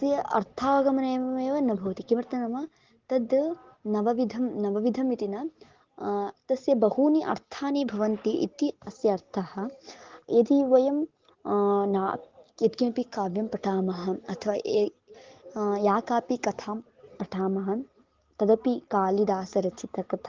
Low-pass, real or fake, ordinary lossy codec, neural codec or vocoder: 7.2 kHz; real; Opus, 16 kbps; none